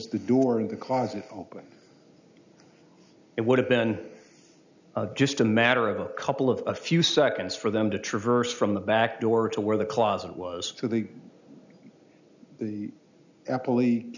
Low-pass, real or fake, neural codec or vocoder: 7.2 kHz; real; none